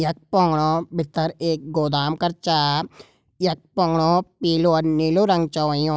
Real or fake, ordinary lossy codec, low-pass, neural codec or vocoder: real; none; none; none